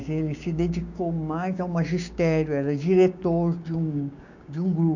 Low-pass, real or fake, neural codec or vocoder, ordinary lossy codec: 7.2 kHz; fake; codec, 16 kHz, 6 kbps, DAC; none